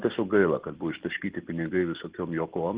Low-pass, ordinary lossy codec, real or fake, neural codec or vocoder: 3.6 kHz; Opus, 16 kbps; real; none